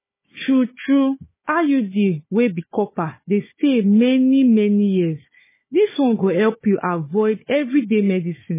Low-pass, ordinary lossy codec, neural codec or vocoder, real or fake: 3.6 kHz; MP3, 16 kbps; codec, 16 kHz, 4 kbps, FunCodec, trained on Chinese and English, 50 frames a second; fake